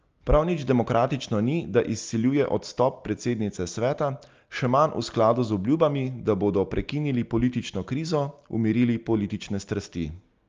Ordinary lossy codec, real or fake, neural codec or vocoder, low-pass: Opus, 32 kbps; real; none; 7.2 kHz